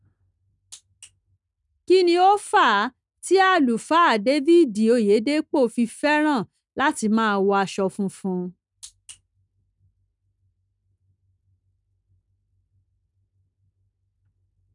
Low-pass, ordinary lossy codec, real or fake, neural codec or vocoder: 10.8 kHz; none; real; none